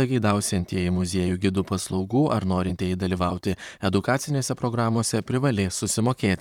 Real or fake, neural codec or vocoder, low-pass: fake; vocoder, 44.1 kHz, 128 mel bands, Pupu-Vocoder; 19.8 kHz